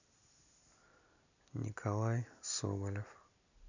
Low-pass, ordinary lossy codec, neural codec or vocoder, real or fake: 7.2 kHz; none; none; real